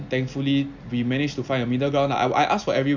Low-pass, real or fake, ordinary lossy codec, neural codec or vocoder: 7.2 kHz; real; none; none